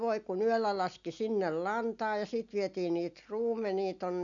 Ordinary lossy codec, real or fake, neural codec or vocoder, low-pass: none; real; none; 7.2 kHz